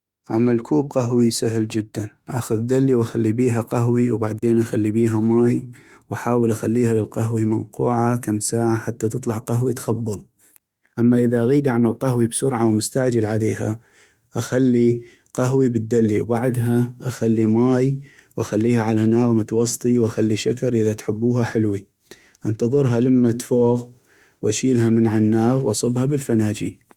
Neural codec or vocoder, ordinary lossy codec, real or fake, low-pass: autoencoder, 48 kHz, 32 numbers a frame, DAC-VAE, trained on Japanese speech; Opus, 64 kbps; fake; 19.8 kHz